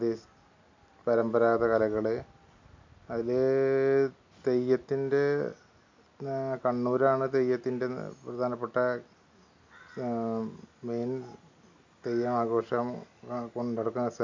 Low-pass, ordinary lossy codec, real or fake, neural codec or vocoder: 7.2 kHz; MP3, 48 kbps; real; none